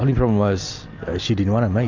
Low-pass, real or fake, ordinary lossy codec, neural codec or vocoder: 7.2 kHz; real; MP3, 64 kbps; none